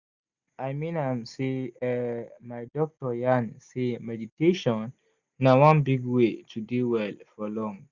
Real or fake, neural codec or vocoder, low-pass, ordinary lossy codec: real; none; 7.2 kHz; Opus, 64 kbps